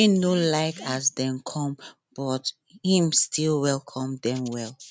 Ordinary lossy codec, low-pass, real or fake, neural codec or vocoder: none; none; real; none